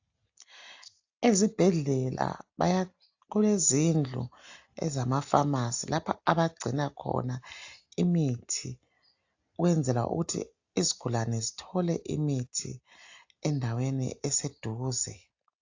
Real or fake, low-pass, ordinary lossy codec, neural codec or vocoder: real; 7.2 kHz; AAC, 48 kbps; none